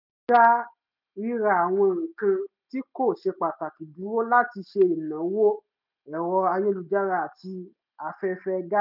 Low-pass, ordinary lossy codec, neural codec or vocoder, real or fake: 5.4 kHz; none; none; real